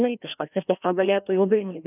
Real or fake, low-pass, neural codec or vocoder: fake; 3.6 kHz; codec, 16 kHz, 1 kbps, FreqCodec, larger model